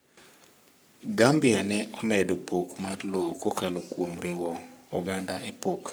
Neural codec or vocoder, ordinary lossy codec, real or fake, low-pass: codec, 44.1 kHz, 3.4 kbps, Pupu-Codec; none; fake; none